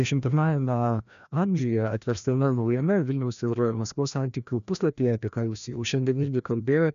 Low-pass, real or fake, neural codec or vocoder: 7.2 kHz; fake; codec, 16 kHz, 1 kbps, FreqCodec, larger model